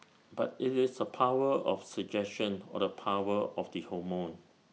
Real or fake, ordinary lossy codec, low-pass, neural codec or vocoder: real; none; none; none